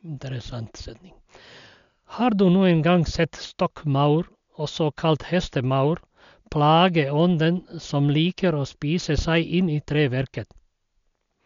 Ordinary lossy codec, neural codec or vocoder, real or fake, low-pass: MP3, 64 kbps; none; real; 7.2 kHz